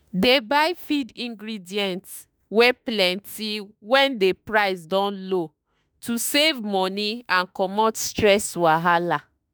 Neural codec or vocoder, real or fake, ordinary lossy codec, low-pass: autoencoder, 48 kHz, 32 numbers a frame, DAC-VAE, trained on Japanese speech; fake; none; none